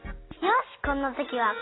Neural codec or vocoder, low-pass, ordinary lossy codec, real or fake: none; 7.2 kHz; AAC, 16 kbps; real